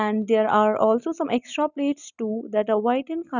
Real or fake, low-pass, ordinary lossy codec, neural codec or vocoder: real; 7.2 kHz; none; none